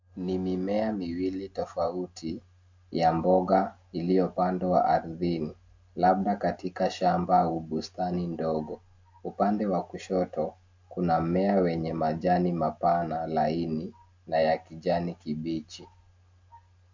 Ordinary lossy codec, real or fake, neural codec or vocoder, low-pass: MP3, 48 kbps; real; none; 7.2 kHz